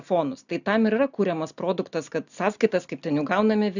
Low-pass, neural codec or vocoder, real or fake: 7.2 kHz; none; real